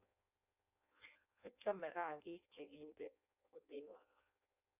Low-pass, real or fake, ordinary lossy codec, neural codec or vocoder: 3.6 kHz; fake; none; codec, 16 kHz in and 24 kHz out, 0.6 kbps, FireRedTTS-2 codec